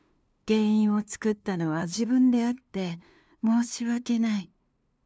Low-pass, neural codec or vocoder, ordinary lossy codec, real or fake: none; codec, 16 kHz, 2 kbps, FunCodec, trained on LibriTTS, 25 frames a second; none; fake